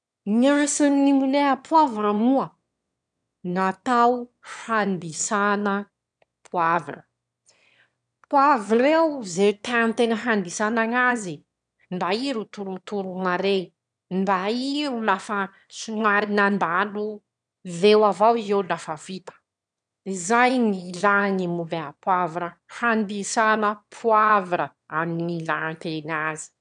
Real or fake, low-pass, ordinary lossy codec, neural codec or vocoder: fake; 9.9 kHz; none; autoencoder, 22.05 kHz, a latent of 192 numbers a frame, VITS, trained on one speaker